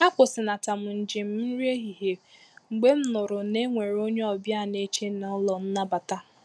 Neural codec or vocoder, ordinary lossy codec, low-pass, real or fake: none; none; none; real